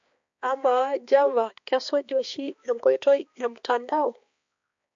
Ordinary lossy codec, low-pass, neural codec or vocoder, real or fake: MP3, 48 kbps; 7.2 kHz; codec, 16 kHz, 2 kbps, X-Codec, HuBERT features, trained on balanced general audio; fake